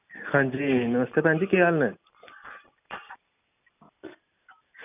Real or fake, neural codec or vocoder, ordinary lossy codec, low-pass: real; none; none; 3.6 kHz